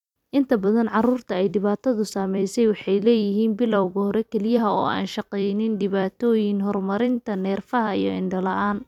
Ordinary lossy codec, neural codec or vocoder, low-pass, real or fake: none; vocoder, 44.1 kHz, 128 mel bands every 256 samples, BigVGAN v2; 19.8 kHz; fake